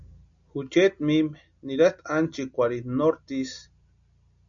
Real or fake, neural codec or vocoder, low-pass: real; none; 7.2 kHz